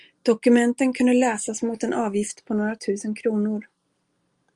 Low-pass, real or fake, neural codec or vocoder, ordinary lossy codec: 10.8 kHz; real; none; Opus, 32 kbps